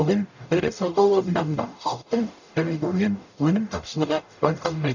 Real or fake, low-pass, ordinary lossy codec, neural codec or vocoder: fake; 7.2 kHz; none; codec, 44.1 kHz, 0.9 kbps, DAC